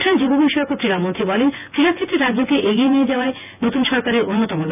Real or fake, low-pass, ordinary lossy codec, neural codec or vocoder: fake; 3.6 kHz; none; vocoder, 24 kHz, 100 mel bands, Vocos